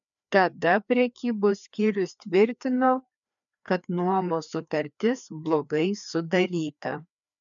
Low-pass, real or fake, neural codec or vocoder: 7.2 kHz; fake; codec, 16 kHz, 2 kbps, FreqCodec, larger model